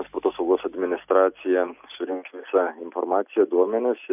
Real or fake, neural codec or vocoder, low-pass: real; none; 3.6 kHz